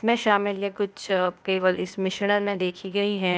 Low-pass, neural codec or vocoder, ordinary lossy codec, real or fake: none; codec, 16 kHz, 0.8 kbps, ZipCodec; none; fake